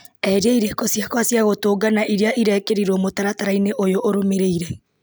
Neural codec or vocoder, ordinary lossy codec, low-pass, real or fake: none; none; none; real